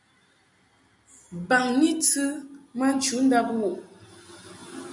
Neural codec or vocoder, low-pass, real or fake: none; 10.8 kHz; real